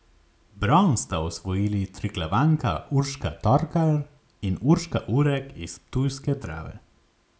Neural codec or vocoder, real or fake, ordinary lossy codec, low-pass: none; real; none; none